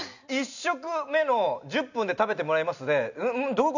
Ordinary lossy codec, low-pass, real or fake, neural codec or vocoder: none; 7.2 kHz; real; none